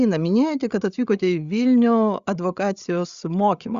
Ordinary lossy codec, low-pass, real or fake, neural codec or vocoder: Opus, 64 kbps; 7.2 kHz; fake; codec, 16 kHz, 8 kbps, FreqCodec, larger model